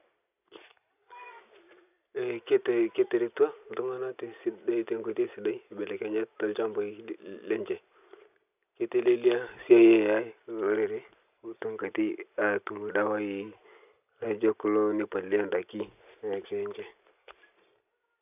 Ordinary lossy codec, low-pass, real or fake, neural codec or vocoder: none; 3.6 kHz; real; none